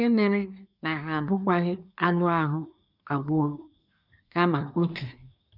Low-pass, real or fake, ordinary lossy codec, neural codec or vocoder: 5.4 kHz; fake; none; codec, 24 kHz, 1 kbps, SNAC